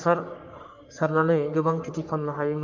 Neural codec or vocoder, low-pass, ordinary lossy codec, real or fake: codec, 44.1 kHz, 3.4 kbps, Pupu-Codec; 7.2 kHz; MP3, 64 kbps; fake